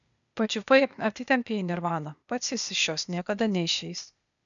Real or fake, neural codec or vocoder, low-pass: fake; codec, 16 kHz, 0.8 kbps, ZipCodec; 7.2 kHz